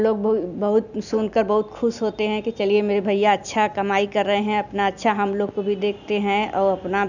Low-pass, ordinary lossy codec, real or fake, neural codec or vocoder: 7.2 kHz; none; real; none